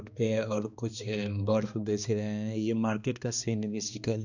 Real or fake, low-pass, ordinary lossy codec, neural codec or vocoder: fake; 7.2 kHz; none; codec, 16 kHz, 2 kbps, X-Codec, HuBERT features, trained on balanced general audio